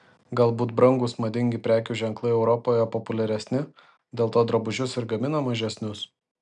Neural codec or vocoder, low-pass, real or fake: none; 9.9 kHz; real